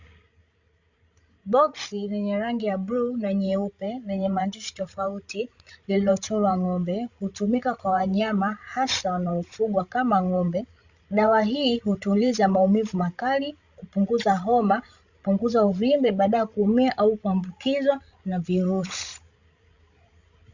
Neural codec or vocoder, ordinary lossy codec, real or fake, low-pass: codec, 16 kHz, 16 kbps, FreqCodec, larger model; Opus, 64 kbps; fake; 7.2 kHz